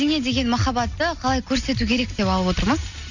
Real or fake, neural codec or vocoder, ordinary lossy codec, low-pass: real; none; none; 7.2 kHz